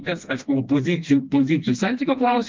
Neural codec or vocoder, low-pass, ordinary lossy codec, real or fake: codec, 16 kHz, 1 kbps, FreqCodec, smaller model; 7.2 kHz; Opus, 32 kbps; fake